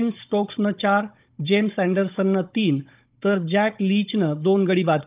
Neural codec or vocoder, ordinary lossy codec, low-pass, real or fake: codec, 16 kHz, 16 kbps, FunCodec, trained on Chinese and English, 50 frames a second; Opus, 24 kbps; 3.6 kHz; fake